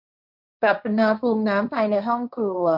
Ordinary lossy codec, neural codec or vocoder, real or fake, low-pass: none; codec, 16 kHz, 1.1 kbps, Voila-Tokenizer; fake; 5.4 kHz